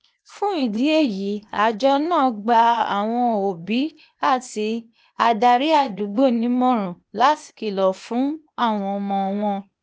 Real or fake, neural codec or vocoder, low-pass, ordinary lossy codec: fake; codec, 16 kHz, 0.8 kbps, ZipCodec; none; none